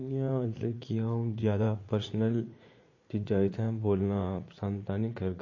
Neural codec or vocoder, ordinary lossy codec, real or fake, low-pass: vocoder, 44.1 kHz, 80 mel bands, Vocos; MP3, 32 kbps; fake; 7.2 kHz